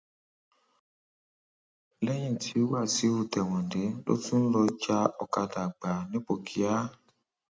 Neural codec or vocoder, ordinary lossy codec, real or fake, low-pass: none; none; real; none